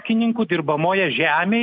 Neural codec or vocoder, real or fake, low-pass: none; real; 5.4 kHz